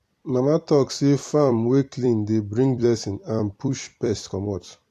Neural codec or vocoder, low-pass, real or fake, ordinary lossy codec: vocoder, 44.1 kHz, 128 mel bands every 256 samples, BigVGAN v2; 14.4 kHz; fake; AAC, 64 kbps